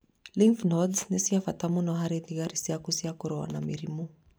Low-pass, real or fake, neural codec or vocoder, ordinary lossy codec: none; real; none; none